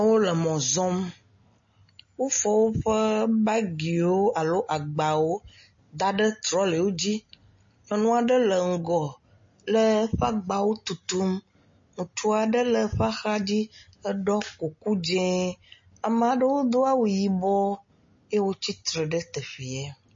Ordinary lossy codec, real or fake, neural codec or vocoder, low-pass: MP3, 32 kbps; real; none; 7.2 kHz